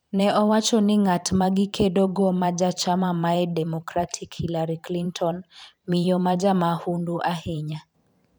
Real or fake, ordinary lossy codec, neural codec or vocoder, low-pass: fake; none; vocoder, 44.1 kHz, 128 mel bands every 512 samples, BigVGAN v2; none